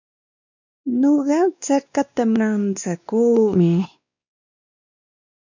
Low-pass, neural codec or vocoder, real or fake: 7.2 kHz; codec, 16 kHz, 2 kbps, X-Codec, WavLM features, trained on Multilingual LibriSpeech; fake